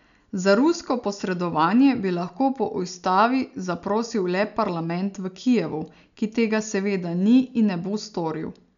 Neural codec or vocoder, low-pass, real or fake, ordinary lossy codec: none; 7.2 kHz; real; none